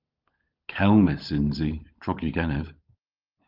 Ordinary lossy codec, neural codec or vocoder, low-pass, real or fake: Opus, 24 kbps; codec, 16 kHz, 16 kbps, FunCodec, trained on LibriTTS, 50 frames a second; 5.4 kHz; fake